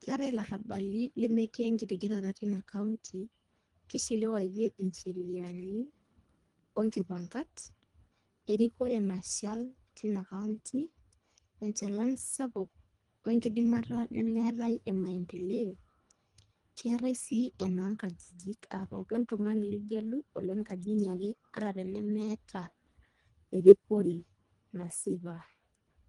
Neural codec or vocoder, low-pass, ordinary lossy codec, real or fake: codec, 24 kHz, 1.5 kbps, HILCodec; 10.8 kHz; Opus, 32 kbps; fake